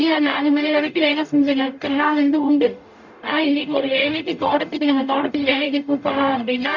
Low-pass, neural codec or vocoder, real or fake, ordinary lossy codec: 7.2 kHz; codec, 44.1 kHz, 0.9 kbps, DAC; fake; none